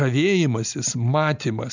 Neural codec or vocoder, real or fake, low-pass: none; real; 7.2 kHz